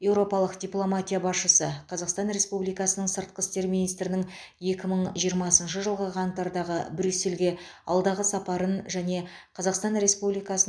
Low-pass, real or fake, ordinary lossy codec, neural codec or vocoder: none; real; none; none